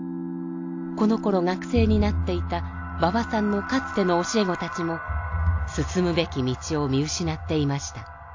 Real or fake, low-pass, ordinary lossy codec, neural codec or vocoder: real; 7.2 kHz; AAC, 48 kbps; none